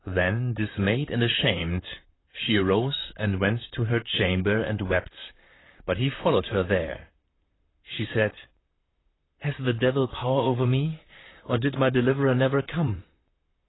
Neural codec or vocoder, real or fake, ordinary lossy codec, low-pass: vocoder, 44.1 kHz, 128 mel bands, Pupu-Vocoder; fake; AAC, 16 kbps; 7.2 kHz